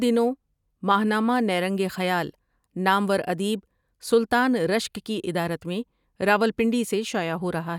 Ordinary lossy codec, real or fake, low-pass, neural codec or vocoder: none; real; 19.8 kHz; none